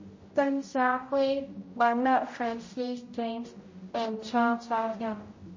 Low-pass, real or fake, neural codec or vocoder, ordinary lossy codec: 7.2 kHz; fake; codec, 16 kHz, 0.5 kbps, X-Codec, HuBERT features, trained on general audio; MP3, 32 kbps